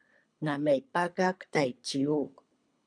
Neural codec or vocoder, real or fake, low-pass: codec, 24 kHz, 3 kbps, HILCodec; fake; 9.9 kHz